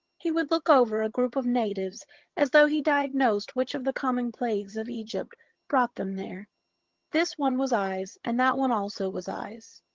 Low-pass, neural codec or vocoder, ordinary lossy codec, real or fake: 7.2 kHz; vocoder, 22.05 kHz, 80 mel bands, HiFi-GAN; Opus, 16 kbps; fake